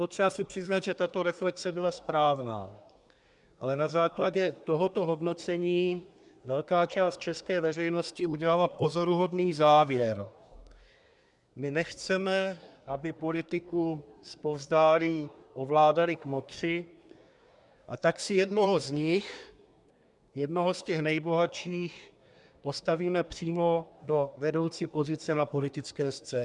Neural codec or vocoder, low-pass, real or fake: codec, 24 kHz, 1 kbps, SNAC; 10.8 kHz; fake